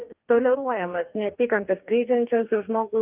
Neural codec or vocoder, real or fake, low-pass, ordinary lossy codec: codec, 44.1 kHz, 2.6 kbps, DAC; fake; 3.6 kHz; Opus, 32 kbps